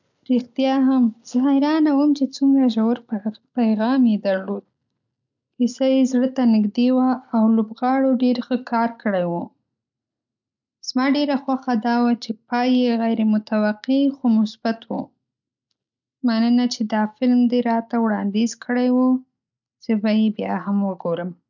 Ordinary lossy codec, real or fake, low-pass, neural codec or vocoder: none; real; 7.2 kHz; none